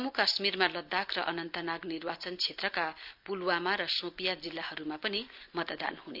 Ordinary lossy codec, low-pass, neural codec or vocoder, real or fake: Opus, 24 kbps; 5.4 kHz; none; real